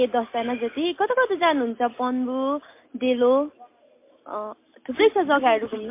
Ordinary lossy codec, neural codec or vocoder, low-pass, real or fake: MP3, 24 kbps; none; 3.6 kHz; real